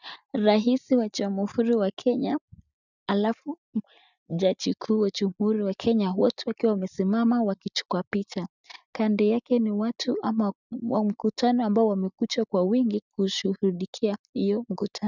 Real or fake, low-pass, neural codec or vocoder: real; 7.2 kHz; none